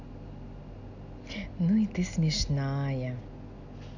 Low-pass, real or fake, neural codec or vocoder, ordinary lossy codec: 7.2 kHz; real; none; none